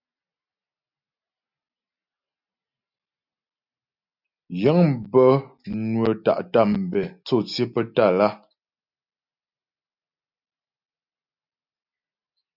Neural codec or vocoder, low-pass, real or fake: none; 5.4 kHz; real